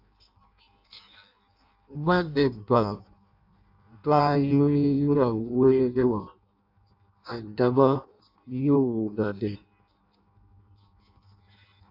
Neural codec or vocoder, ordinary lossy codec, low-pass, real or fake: codec, 16 kHz in and 24 kHz out, 0.6 kbps, FireRedTTS-2 codec; AAC, 48 kbps; 5.4 kHz; fake